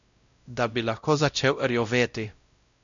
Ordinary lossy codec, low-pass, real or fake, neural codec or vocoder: none; 7.2 kHz; fake; codec, 16 kHz, 0.5 kbps, X-Codec, WavLM features, trained on Multilingual LibriSpeech